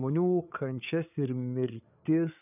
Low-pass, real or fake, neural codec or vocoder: 3.6 kHz; fake; codec, 16 kHz, 8 kbps, FreqCodec, larger model